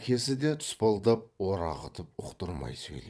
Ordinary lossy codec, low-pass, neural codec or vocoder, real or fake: none; none; vocoder, 22.05 kHz, 80 mel bands, WaveNeXt; fake